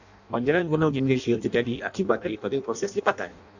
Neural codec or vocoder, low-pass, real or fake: codec, 16 kHz in and 24 kHz out, 0.6 kbps, FireRedTTS-2 codec; 7.2 kHz; fake